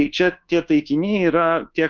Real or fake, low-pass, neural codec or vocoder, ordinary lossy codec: fake; 7.2 kHz; codec, 24 kHz, 1.2 kbps, DualCodec; Opus, 24 kbps